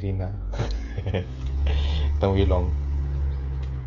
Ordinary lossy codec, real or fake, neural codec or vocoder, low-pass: MP3, 48 kbps; fake; codec, 16 kHz, 16 kbps, FreqCodec, smaller model; 7.2 kHz